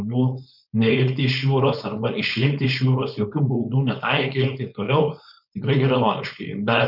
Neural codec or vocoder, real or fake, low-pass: codec, 16 kHz, 4.8 kbps, FACodec; fake; 5.4 kHz